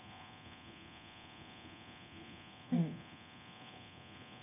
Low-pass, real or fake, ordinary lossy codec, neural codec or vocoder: 3.6 kHz; fake; none; codec, 24 kHz, 0.9 kbps, DualCodec